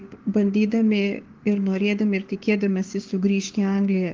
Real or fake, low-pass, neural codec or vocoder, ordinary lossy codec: fake; 7.2 kHz; codec, 16 kHz, 6 kbps, DAC; Opus, 16 kbps